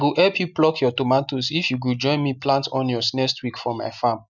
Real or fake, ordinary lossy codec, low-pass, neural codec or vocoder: real; none; 7.2 kHz; none